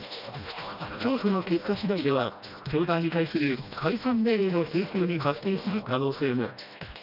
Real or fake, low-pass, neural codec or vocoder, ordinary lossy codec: fake; 5.4 kHz; codec, 16 kHz, 1 kbps, FreqCodec, smaller model; none